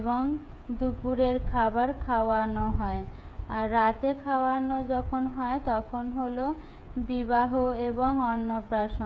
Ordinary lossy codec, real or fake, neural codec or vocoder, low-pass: none; fake; codec, 16 kHz, 8 kbps, FreqCodec, smaller model; none